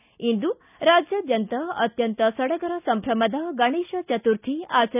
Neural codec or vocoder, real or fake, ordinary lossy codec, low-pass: none; real; none; 3.6 kHz